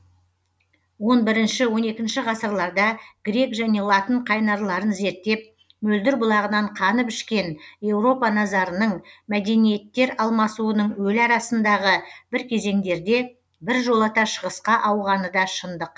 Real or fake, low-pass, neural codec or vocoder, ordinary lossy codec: real; none; none; none